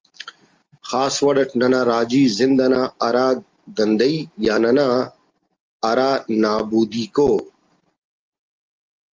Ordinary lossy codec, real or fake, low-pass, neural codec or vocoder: Opus, 32 kbps; real; 7.2 kHz; none